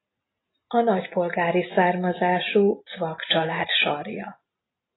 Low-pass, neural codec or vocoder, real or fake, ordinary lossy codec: 7.2 kHz; none; real; AAC, 16 kbps